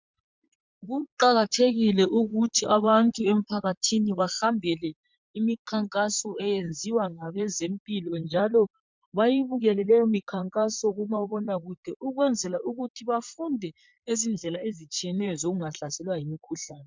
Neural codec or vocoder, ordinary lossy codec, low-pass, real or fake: vocoder, 44.1 kHz, 128 mel bands, Pupu-Vocoder; MP3, 64 kbps; 7.2 kHz; fake